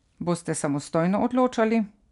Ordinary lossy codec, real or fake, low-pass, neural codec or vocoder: none; real; 10.8 kHz; none